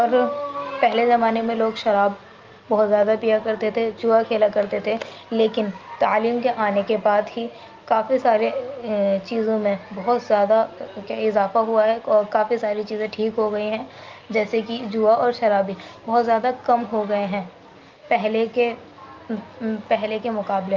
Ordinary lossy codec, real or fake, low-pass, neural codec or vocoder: Opus, 24 kbps; real; 7.2 kHz; none